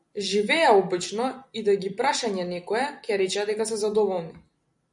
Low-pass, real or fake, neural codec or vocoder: 10.8 kHz; real; none